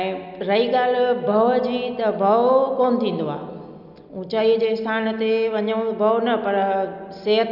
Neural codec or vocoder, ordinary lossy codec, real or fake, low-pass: none; none; real; 5.4 kHz